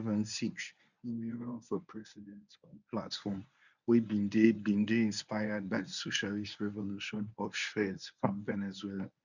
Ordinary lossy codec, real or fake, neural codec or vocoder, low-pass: none; fake; codec, 24 kHz, 0.9 kbps, WavTokenizer, medium speech release version 1; 7.2 kHz